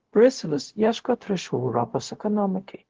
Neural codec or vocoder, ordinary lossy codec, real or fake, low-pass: codec, 16 kHz, 0.4 kbps, LongCat-Audio-Codec; Opus, 16 kbps; fake; 7.2 kHz